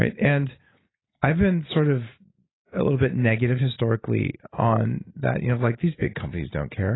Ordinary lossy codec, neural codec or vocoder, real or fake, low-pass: AAC, 16 kbps; none; real; 7.2 kHz